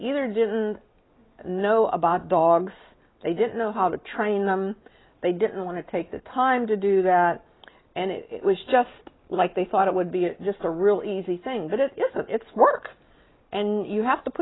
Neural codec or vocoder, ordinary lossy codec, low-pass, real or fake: none; AAC, 16 kbps; 7.2 kHz; real